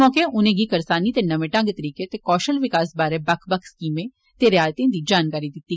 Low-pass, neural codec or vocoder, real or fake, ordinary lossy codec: none; none; real; none